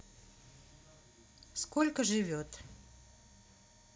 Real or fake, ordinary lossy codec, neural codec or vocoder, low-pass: real; none; none; none